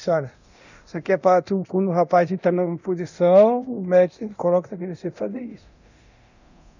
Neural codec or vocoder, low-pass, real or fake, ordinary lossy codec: codec, 24 kHz, 0.9 kbps, DualCodec; 7.2 kHz; fake; none